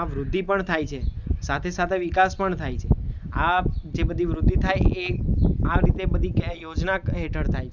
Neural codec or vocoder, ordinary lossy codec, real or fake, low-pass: none; none; real; 7.2 kHz